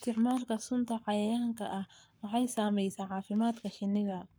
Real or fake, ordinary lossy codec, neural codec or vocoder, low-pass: fake; none; codec, 44.1 kHz, 7.8 kbps, Pupu-Codec; none